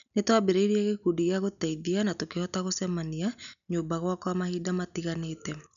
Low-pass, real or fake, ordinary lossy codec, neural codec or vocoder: 7.2 kHz; real; none; none